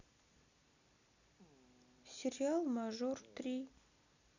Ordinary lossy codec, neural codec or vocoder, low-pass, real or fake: none; none; 7.2 kHz; real